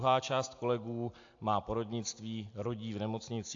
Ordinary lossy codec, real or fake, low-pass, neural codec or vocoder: AAC, 48 kbps; real; 7.2 kHz; none